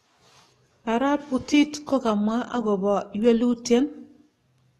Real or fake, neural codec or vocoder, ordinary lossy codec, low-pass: fake; codec, 44.1 kHz, 7.8 kbps, DAC; AAC, 32 kbps; 19.8 kHz